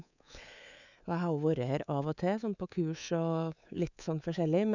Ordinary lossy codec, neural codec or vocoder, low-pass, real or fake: none; codec, 24 kHz, 3.1 kbps, DualCodec; 7.2 kHz; fake